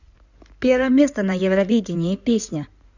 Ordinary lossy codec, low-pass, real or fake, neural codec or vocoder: none; 7.2 kHz; fake; codec, 16 kHz in and 24 kHz out, 2.2 kbps, FireRedTTS-2 codec